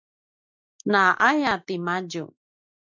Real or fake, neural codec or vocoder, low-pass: real; none; 7.2 kHz